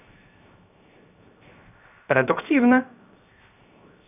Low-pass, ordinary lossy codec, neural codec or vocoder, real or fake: 3.6 kHz; none; codec, 16 kHz, 0.7 kbps, FocalCodec; fake